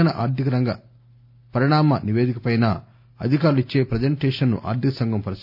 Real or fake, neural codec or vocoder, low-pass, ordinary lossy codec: real; none; 5.4 kHz; none